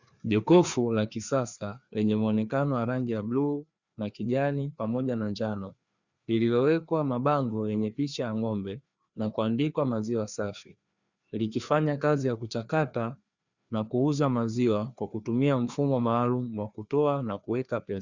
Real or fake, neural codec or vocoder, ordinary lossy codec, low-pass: fake; codec, 16 kHz, 2 kbps, FreqCodec, larger model; Opus, 64 kbps; 7.2 kHz